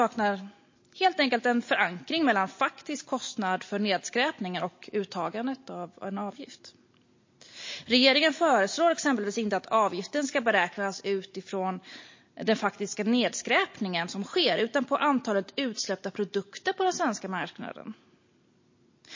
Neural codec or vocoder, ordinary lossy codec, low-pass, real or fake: none; MP3, 32 kbps; 7.2 kHz; real